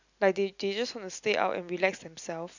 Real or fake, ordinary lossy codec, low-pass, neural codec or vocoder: real; none; 7.2 kHz; none